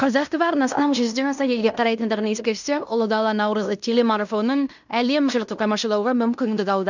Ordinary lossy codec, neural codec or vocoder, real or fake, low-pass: none; codec, 16 kHz in and 24 kHz out, 0.9 kbps, LongCat-Audio-Codec, fine tuned four codebook decoder; fake; 7.2 kHz